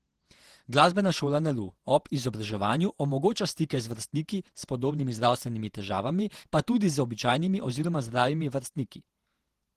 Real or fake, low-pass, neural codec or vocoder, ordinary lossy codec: fake; 14.4 kHz; vocoder, 48 kHz, 128 mel bands, Vocos; Opus, 16 kbps